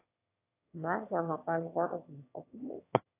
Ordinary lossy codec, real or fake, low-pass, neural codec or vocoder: AAC, 24 kbps; fake; 3.6 kHz; autoencoder, 22.05 kHz, a latent of 192 numbers a frame, VITS, trained on one speaker